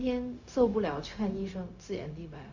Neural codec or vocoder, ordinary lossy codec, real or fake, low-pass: codec, 16 kHz, 0.4 kbps, LongCat-Audio-Codec; Opus, 64 kbps; fake; 7.2 kHz